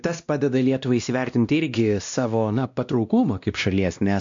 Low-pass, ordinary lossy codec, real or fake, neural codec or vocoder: 7.2 kHz; AAC, 64 kbps; fake; codec, 16 kHz, 1 kbps, X-Codec, WavLM features, trained on Multilingual LibriSpeech